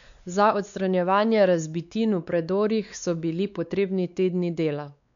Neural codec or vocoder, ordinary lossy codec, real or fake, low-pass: codec, 16 kHz, 4 kbps, X-Codec, WavLM features, trained on Multilingual LibriSpeech; none; fake; 7.2 kHz